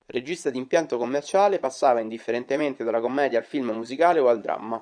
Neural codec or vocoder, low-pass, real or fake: vocoder, 22.05 kHz, 80 mel bands, Vocos; 9.9 kHz; fake